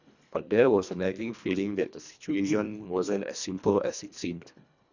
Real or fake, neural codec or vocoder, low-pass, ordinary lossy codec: fake; codec, 24 kHz, 1.5 kbps, HILCodec; 7.2 kHz; none